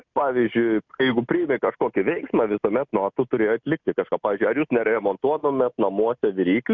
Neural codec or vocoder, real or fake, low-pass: none; real; 7.2 kHz